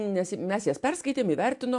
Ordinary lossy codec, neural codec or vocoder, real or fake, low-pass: AAC, 64 kbps; none; real; 10.8 kHz